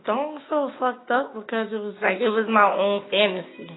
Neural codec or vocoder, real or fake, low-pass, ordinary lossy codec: codec, 16 kHz, 6 kbps, DAC; fake; 7.2 kHz; AAC, 16 kbps